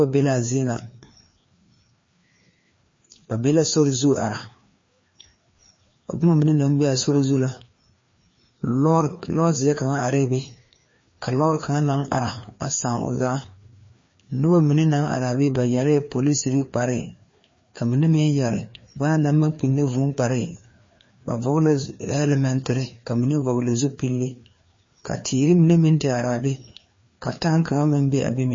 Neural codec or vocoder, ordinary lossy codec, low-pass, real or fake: codec, 16 kHz, 2 kbps, FreqCodec, larger model; MP3, 32 kbps; 7.2 kHz; fake